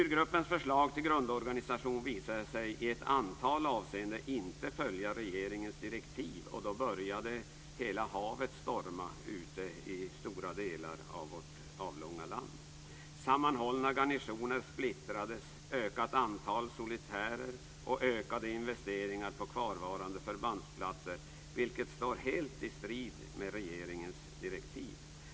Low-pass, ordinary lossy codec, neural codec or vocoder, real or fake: none; none; none; real